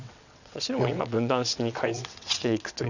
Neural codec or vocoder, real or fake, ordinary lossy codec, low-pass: vocoder, 22.05 kHz, 80 mel bands, WaveNeXt; fake; none; 7.2 kHz